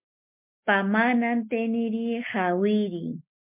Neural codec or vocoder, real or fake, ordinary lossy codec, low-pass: none; real; MP3, 32 kbps; 3.6 kHz